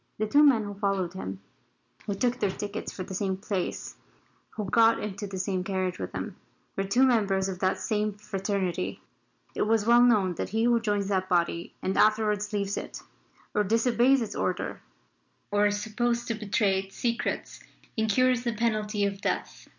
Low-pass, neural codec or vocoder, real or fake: 7.2 kHz; none; real